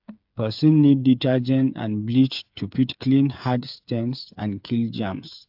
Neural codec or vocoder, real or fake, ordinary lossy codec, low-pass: codec, 16 kHz, 8 kbps, FreqCodec, smaller model; fake; AAC, 48 kbps; 5.4 kHz